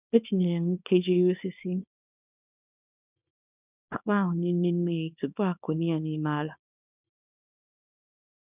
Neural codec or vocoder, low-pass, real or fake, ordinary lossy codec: codec, 24 kHz, 0.9 kbps, WavTokenizer, small release; 3.6 kHz; fake; none